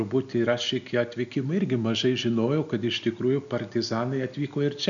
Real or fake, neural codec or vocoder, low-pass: real; none; 7.2 kHz